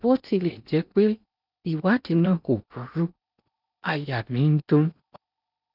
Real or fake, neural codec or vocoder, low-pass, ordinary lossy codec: fake; codec, 16 kHz in and 24 kHz out, 0.8 kbps, FocalCodec, streaming, 65536 codes; 5.4 kHz; none